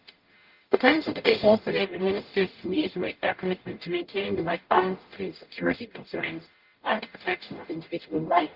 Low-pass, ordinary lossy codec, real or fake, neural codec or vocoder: 5.4 kHz; Opus, 32 kbps; fake; codec, 44.1 kHz, 0.9 kbps, DAC